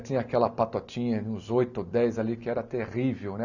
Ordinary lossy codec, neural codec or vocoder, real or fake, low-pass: none; none; real; 7.2 kHz